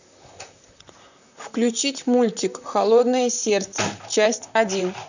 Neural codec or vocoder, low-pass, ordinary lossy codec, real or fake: vocoder, 44.1 kHz, 128 mel bands, Pupu-Vocoder; 7.2 kHz; none; fake